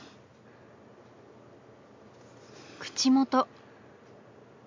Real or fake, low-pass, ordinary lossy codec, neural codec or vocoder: real; 7.2 kHz; MP3, 64 kbps; none